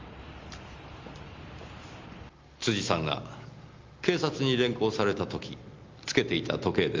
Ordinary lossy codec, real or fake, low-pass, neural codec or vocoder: Opus, 32 kbps; real; 7.2 kHz; none